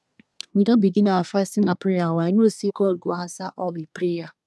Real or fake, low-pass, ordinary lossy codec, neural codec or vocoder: fake; none; none; codec, 24 kHz, 1 kbps, SNAC